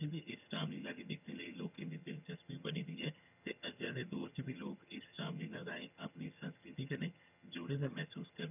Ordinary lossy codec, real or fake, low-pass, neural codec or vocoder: none; fake; 3.6 kHz; vocoder, 22.05 kHz, 80 mel bands, HiFi-GAN